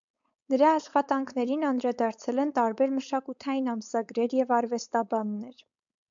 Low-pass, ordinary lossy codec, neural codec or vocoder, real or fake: 7.2 kHz; MP3, 64 kbps; codec, 16 kHz, 4.8 kbps, FACodec; fake